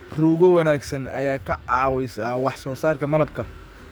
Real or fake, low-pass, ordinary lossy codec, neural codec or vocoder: fake; none; none; codec, 44.1 kHz, 2.6 kbps, SNAC